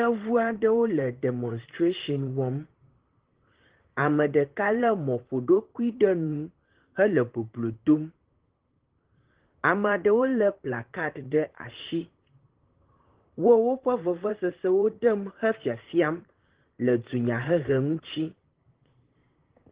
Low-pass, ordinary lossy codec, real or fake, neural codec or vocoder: 3.6 kHz; Opus, 16 kbps; fake; vocoder, 44.1 kHz, 128 mel bands, Pupu-Vocoder